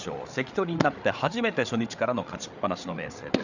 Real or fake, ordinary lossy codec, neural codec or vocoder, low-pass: fake; none; codec, 16 kHz, 8 kbps, FreqCodec, larger model; 7.2 kHz